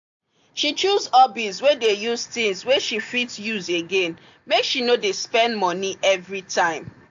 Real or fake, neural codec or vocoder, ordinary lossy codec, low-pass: real; none; AAC, 64 kbps; 7.2 kHz